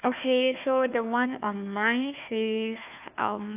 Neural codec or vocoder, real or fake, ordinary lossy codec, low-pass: codec, 16 kHz, 1 kbps, FunCodec, trained on Chinese and English, 50 frames a second; fake; AAC, 32 kbps; 3.6 kHz